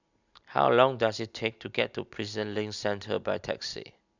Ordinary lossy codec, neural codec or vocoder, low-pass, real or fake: none; none; 7.2 kHz; real